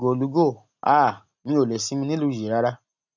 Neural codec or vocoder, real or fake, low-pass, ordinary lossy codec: none; real; 7.2 kHz; none